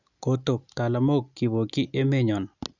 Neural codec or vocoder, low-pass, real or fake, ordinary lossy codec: none; 7.2 kHz; real; none